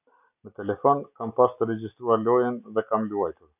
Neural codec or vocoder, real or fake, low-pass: none; real; 3.6 kHz